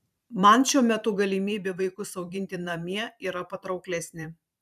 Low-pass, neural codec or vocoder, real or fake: 14.4 kHz; vocoder, 44.1 kHz, 128 mel bands every 512 samples, BigVGAN v2; fake